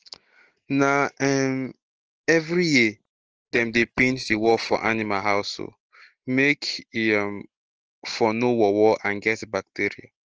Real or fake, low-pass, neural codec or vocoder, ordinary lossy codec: real; 7.2 kHz; none; Opus, 16 kbps